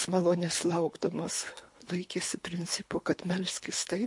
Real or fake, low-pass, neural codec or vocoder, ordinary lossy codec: fake; 10.8 kHz; codec, 24 kHz, 3 kbps, HILCodec; MP3, 48 kbps